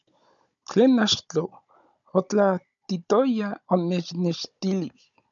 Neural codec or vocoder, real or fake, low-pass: codec, 16 kHz, 16 kbps, FunCodec, trained on Chinese and English, 50 frames a second; fake; 7.2 kHz